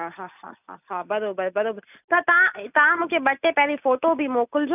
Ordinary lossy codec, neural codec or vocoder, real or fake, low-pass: none; none; real; 3.6 kHz